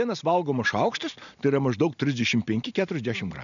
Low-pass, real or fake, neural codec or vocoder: 7.2 kHz; real; none